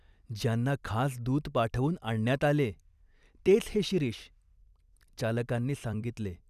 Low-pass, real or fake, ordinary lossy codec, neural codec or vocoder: 14.4 kHz; fake; none; vocoder, 44.1 kHz, 128 mel bands every 256 samples, BigVGAN v2